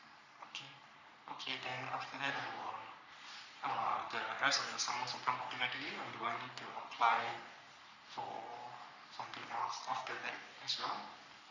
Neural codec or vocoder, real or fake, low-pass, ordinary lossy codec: codec, 44.1 kHz, 3.4 kbps, Pupu-Codec; fake; 7.2 kHz; none